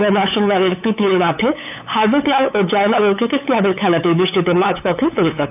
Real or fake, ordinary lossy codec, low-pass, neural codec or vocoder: fake; none; 3.6 kHz; codec, 16 kHz, 8 kbps, FunCodec, trained on LibriTTS, 25 frames a second